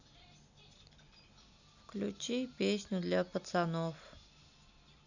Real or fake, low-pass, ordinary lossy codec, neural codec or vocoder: real; 7.2 kHz; none; none